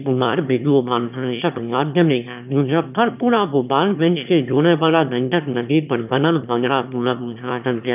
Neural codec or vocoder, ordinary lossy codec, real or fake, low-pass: autoencoder, 22.05 kHz, a latent of 192 numbers a frame, VITS, trained on one speaker; none; fake; 3.6 kHz